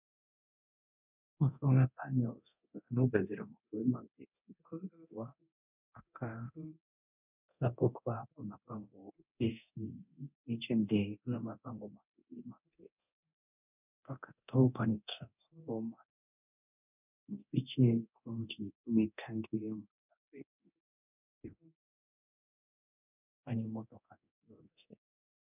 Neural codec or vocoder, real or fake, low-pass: codec, 24 kHz, 0.9 kbps, DualCodec; fake; 3.6 kHz